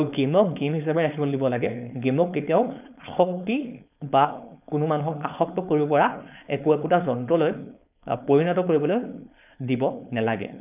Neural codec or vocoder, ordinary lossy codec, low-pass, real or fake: codec, 16 kHz, 4.8 kbps, FACodec; none; 3.6 kHz; fake